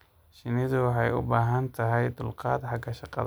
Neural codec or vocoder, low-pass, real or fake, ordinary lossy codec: none; none; real; none